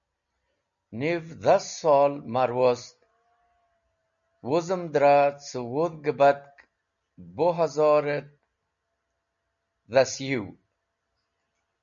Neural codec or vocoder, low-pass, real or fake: none; 7.2 kHz; real